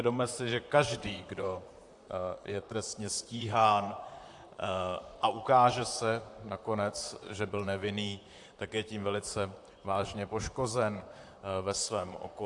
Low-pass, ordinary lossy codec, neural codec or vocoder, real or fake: 10.8 kHz; AAC, 64 kbps; vocoder, 44.1 kHz, 128 mel bands, Pupu-Vocoder; fake